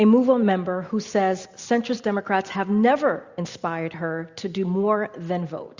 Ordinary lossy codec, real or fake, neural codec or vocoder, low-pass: Opus, 64 kbps; real; none; 7.2 kHz